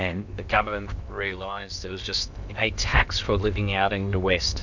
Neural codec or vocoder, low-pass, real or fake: codec, 16 kHz in and 24 kHz out, 0.8 kbps, FocalCodec, streaming, 65536 codes; 7.2 kHz; fake